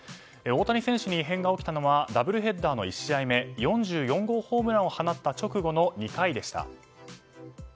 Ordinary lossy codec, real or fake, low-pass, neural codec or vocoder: none; real; none; none